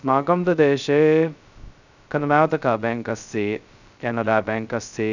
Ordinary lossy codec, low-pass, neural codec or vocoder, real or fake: none; 7.2 kHz; codec, 16 kHz, 0.2 kbps, FocalCodec; fake